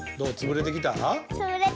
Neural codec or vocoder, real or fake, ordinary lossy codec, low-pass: none; real; none; none